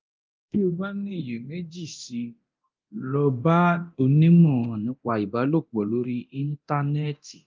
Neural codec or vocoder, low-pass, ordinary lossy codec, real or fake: codec, 24 kHz, 0.9 kbps, DualCodec; 7.2 kHz; Opus, 32 kbps; fake